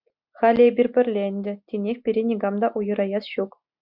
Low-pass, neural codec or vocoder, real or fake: 5.4 kHz; none; real